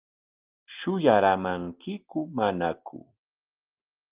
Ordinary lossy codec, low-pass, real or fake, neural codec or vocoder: Opus, 32 kbps; 3.6 kHz; real; none